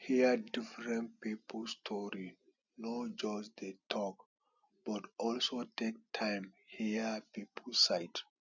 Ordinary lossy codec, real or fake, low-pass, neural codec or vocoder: none; real; 7.2 kHz; none